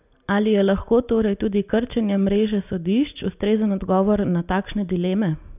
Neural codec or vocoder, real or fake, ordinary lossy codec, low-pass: none; real; none; 3.6 kHz